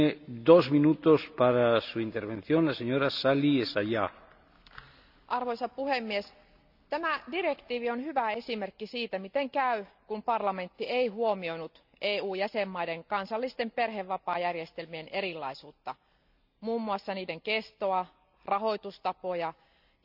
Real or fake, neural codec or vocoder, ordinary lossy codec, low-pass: real; none; none; 5.4 kHz